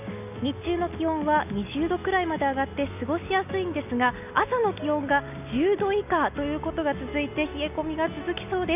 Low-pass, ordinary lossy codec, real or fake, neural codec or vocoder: 3.6 kHz; none; real; none